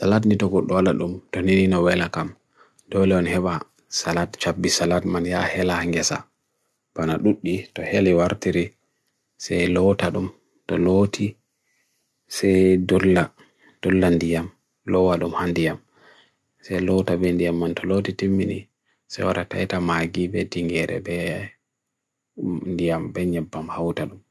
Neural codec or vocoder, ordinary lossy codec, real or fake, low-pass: none; none; real; none